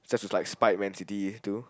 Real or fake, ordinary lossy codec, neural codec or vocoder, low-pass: real; none; none; none